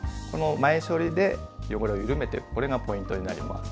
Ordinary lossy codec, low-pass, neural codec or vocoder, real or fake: none; none; none; real